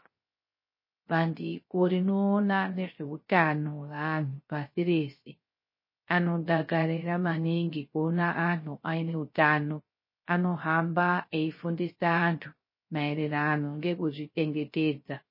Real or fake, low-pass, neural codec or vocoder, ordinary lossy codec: fake; 5.4 kHz; codec, 16 kHz, 0.3 kbps, FocalCodec; MP3, 24 kbps